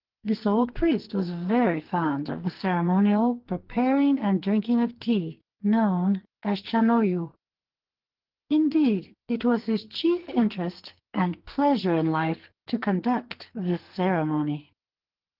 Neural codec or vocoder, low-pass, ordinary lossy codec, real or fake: codec, 44.1 kHz, 2.6 kbps, SNAC; 5.4 kHz; Opus, 16 kbps; fake